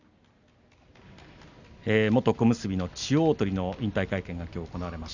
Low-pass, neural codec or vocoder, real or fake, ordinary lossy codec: 7.2 kHz; none; real; none